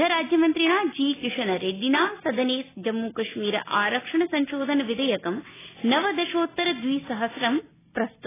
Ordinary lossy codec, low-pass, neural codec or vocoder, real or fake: AAC, 16 kbps; 3.6 kHz; none; real